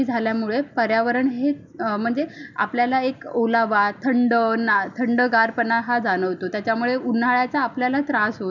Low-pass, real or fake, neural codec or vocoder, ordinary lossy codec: 7.2 kHz; real; none; none